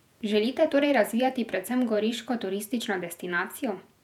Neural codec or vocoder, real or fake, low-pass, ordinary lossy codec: vocoder, 48 kHz, 128 mel bands, Vocos; fake; 19.8 kHz; none